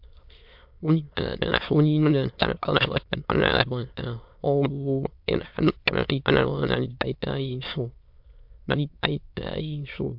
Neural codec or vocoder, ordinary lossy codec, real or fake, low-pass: autoencoder, 22.05 kHz, a latent of 192 numbers a frame, VITS, trained on many speakers; MP3, 48 kbps; fake; 5.4 kHz